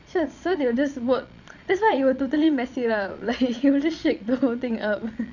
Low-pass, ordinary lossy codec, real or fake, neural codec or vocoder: 7.2 kHz; none; fake; vocoder, 22.05 kHz, 80 mel bands, Vocos